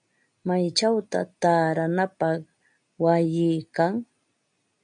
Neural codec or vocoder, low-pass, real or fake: none; 9.9 kHz; real